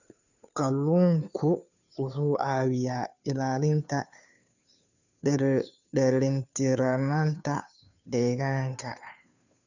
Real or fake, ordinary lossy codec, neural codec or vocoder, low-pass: fake; none; codec, 16 kHz, 2 kbps, FunCodec, trained on Chinese and English, 25 frames a second; 7.2 kHz